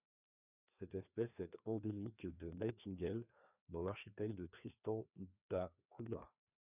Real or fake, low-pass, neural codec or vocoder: fake; 3.6 kHz; codec, 16 kHz, 2 kbps, FreqCodec, larger model